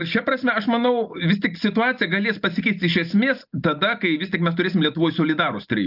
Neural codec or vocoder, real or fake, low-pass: none; real; 5.4 kHz